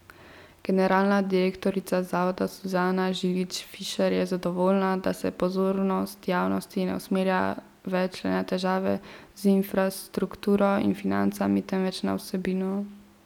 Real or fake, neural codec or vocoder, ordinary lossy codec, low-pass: real; none; none; 19.8 kHz